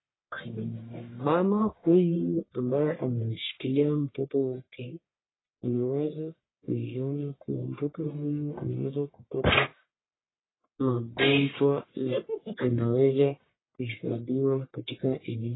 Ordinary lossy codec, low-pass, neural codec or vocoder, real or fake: AAC, 16 kbps; 7.2 kHz; codec, 44.1 kHz, 1.7 kbps, Pupu-Codec; fake